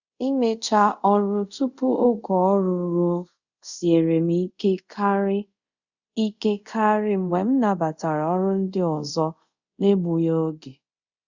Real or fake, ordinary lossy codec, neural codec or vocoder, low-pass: fake; Opus, 64 kbps; codec, 24 kHz, 0.5 kbps, DualCodec; 7.2 kHz